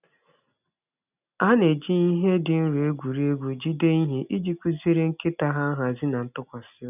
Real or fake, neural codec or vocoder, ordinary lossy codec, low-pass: real; none; none; 3.6 kHz